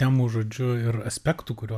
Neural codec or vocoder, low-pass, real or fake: none; 14.4 kHz; real